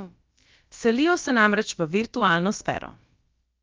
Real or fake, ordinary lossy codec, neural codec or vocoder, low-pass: fake; Opus, 24 kbps; codec, 16 kHz, about 1 kbps, DyCAST, with the encoder's durations; 7.2 kHz